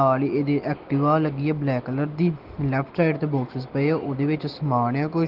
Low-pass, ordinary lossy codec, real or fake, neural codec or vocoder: 5.4 kHz; Opus, 16 kbps; real; none